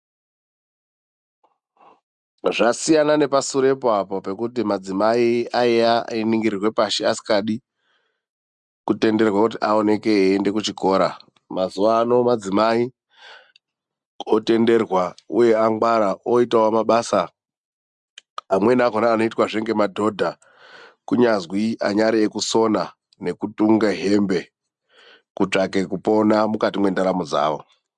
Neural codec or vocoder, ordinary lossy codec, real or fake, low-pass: none; MP3, 96 kbps; real; 10.8 kHz